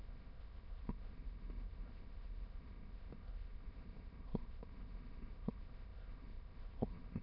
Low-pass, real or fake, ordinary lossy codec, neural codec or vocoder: 5.4 kHz; fake; none; autoencoder, 22.05 kHz, a latent of 192 numbers a frame, VITS, trained on many speakers